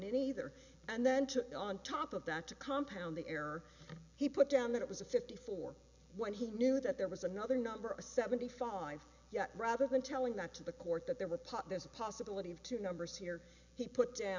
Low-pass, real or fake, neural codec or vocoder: 7.2 kHz; real; none